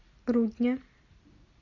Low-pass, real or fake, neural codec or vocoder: 7.2 kHz; real; none